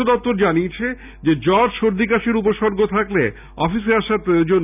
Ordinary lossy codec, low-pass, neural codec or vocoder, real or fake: none; 3.6 kHz; none; real